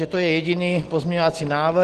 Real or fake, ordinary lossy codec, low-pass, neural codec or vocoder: real; Opus, 16 kbps; 10.8 kHz; none